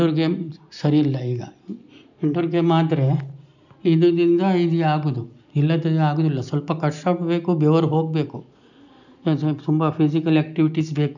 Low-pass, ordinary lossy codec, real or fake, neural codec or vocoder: 7.2 kHz; none; real; none